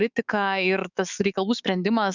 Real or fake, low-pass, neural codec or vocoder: fake; 7.2 kHz; autoencoder, 48 kHz, 128 numbers a frame, DAC-VAE, trained on Japanese speech